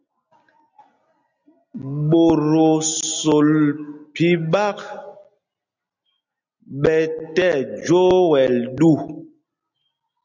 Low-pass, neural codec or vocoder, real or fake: 7.2 kHz; none; real